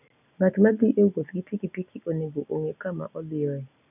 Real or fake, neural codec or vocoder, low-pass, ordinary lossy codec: real; none; 3.6 kHz; none